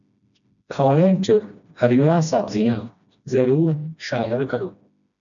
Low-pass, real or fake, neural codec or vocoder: 7.2 kHz; fake; codec, 16 kHz, 1 kbps, FreqCodec, smaller model